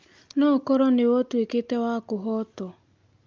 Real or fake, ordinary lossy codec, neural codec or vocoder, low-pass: real; Opus, 24 kbps; none; 7.2 kHz